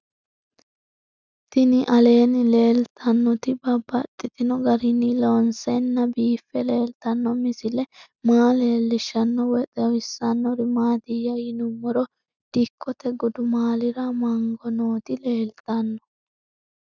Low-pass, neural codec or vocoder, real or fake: 7.2 kHz; none; real